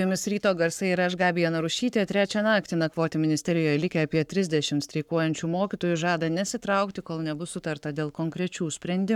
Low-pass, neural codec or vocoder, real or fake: 19.8 kHz; codec, 44.1 kHz, 7.8 kbps, Pupu-Codec; fake